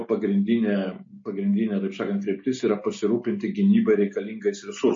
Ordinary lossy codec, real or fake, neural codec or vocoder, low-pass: MP3, 32 kbps; real; none; 7.2 kHz